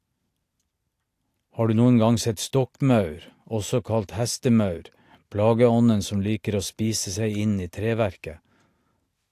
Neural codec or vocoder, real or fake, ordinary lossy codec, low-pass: none; real; AAC, 64 kbps; 14.4 kHz